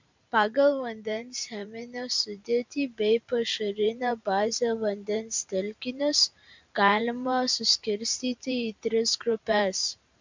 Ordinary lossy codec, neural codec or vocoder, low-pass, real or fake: MP3, 64 kbps; vocoder, 44.1 kHz, 128 mel bands every 512 samples, BigVGAN v2; 7.2 kHz; fake